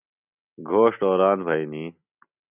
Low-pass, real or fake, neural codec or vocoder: 3.6 kHz; real; none